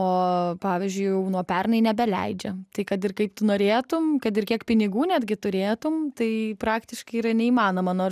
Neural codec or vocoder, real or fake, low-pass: none; real; 14.4 kHz